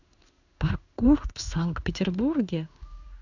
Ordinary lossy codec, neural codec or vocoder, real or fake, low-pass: none; codec, 16 kHz in and 24 kHz out, 1 kbps, XY-Tokenizer; fake; 7.2 kHz